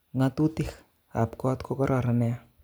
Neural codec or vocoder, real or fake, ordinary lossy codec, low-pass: none; real; none; none